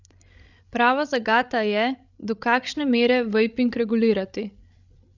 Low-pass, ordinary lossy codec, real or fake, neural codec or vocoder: 7.2 kHz; none; fake; codec, 16 kHz, 16 kbps, FreqCodec, larger model